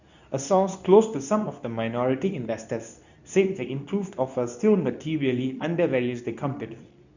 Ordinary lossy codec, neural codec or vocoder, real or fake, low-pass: none; codec, 24 kHz, 0.9 kbps, WavTokenizer, medium speech release version 2; fake; 7.2 kHz